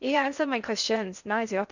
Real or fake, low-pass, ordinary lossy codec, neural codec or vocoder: fake; 7.2 kHz; none; codec, 16 kHz in and 24 kHz out, 0.6 kbps, FocalCodec, streaming, 4096 codes